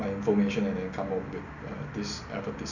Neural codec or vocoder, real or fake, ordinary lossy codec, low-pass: none; real; none; 7.2 kHz